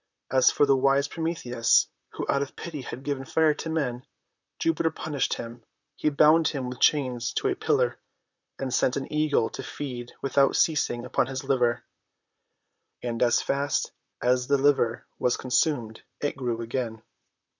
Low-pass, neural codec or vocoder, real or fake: 7.2 kHz; vocoder, 44.1 kHz, 128 mel bands, Pupu-Vocoder; fake